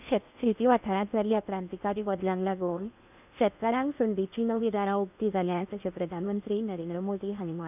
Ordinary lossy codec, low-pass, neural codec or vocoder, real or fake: none; 3.6 kHz; codec, 16 kHz in and 24 kHz out, 0.8 kbps, FocalCodec, streaming, 65536 codes; fake